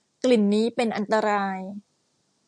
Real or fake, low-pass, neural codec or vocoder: real; 9.9 kHz; none